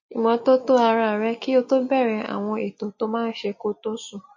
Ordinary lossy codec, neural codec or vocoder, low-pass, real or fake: MP3, 32 kbps; none; 7.2 kHz; real